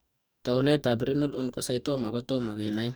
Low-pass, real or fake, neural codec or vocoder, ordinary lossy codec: none; fake; codec, 44.1 kHz, 2.6 kbps, DAC; none